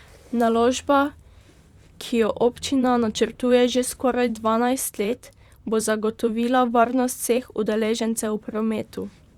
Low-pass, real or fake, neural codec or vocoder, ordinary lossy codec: 19.8 kHz; fake; vocoder, 44.1 kHz, 128 mel bands, Pupu-Vocoder; Opus, 64 kbps